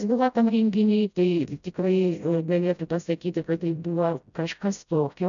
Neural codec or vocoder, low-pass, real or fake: codec, 16 kHz, 0.5 kbps, FreqCodec, smaller model; 7.2 kHz; fake